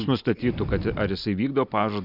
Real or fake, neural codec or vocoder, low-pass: real; none; 5.4 kHz